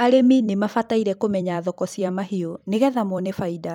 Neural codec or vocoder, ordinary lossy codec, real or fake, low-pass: vocoder, 44.1 kHz, 128 mel bands every 256 samples, BigVGAN v2; none; fake; 19.8 kHz